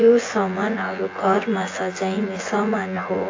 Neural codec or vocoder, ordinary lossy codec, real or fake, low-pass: vocoder, 24 kHz, 100 mel bands, Vocos; AAC, 32 kbps; fake; 7.2 kHz